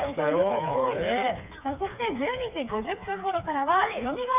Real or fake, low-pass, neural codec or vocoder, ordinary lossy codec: fake; 3.6 kHz; codec, 16 kHz, 4 kbps, FreqCodec, smaller model; none